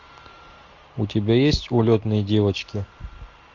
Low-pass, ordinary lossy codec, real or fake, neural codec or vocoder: 7.2 kHz; AAC, 48 kbps; real; none